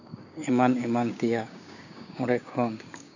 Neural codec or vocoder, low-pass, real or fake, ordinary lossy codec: codec, 16 kHz, 6 kbps, DAC; 7.2 kHz; fake; none